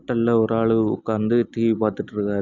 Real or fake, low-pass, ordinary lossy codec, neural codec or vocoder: real; 7.2 kHz; none; none